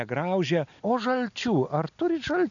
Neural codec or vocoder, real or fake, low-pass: none; real; 7.2 kHz